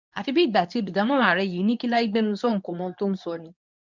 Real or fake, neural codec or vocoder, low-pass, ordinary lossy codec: fake; codec, 24 kHz, 0.9 kbps, WavTokenizer, medium speech release version 2; 7.2 kHz; none